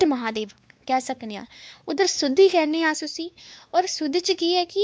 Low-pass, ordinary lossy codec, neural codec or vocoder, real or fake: none; none; codec, 16 kHz, 2 kbps, X-Codec, WavLM features, trained on Multilingual LibriSpeech; fake